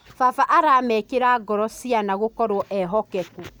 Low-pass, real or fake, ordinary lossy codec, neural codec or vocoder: none; real; none; none